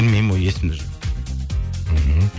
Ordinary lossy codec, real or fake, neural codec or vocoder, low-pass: none; real; none; none